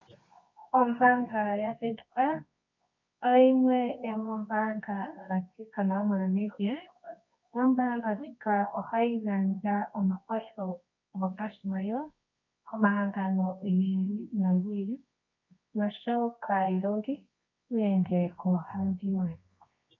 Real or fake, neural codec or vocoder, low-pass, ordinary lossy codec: fake; codec, 24 kHz, 0.9 kbps, WavTokenizer, medium music audio release; 7.2 kHz; MP3, 64 kbps